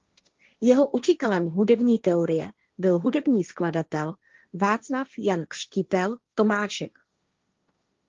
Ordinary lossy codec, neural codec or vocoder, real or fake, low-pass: Opus, 32 kbps; codec, 16 kHz, 1.1 kbps, Voila-Tokenizer; fake; 7.2 kHz